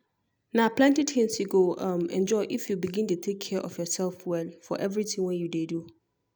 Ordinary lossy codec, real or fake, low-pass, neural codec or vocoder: none; real; none; none